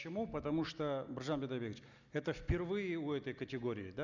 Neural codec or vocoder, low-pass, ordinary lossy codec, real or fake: none; 7.2 kHz; none; real